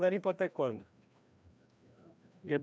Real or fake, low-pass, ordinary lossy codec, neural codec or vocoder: fake; none; none; codec, 16 kHz, 1 kbps, FreqCodec, larger model